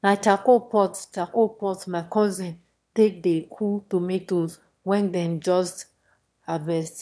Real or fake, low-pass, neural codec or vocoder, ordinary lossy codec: fake; none; autoencoder, 22.05 kHz, a latent of 192 numbers a frame, VITS, trained on one speaker; none